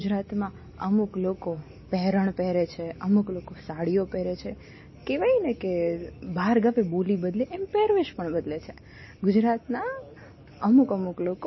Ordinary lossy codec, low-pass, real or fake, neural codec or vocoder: MP3, 24 kbps; 7.2 kHz; real; none